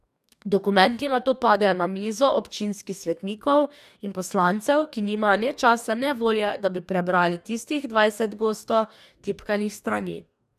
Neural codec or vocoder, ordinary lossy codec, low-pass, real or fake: codec, 44.1 kHz, 2.6 kbps, DAC; none; 14.4 kHz; fake